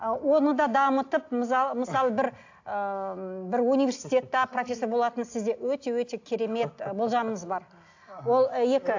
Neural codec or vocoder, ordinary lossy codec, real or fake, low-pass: none; AAC, 48 kbps; real; 7.2 kHz